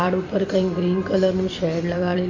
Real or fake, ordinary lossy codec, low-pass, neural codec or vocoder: fake; MP3, 64 kbps; 7.2 kHz; vocoder, 44.1 kHz, 128 mel bands every 256 samples, BigVGAN v2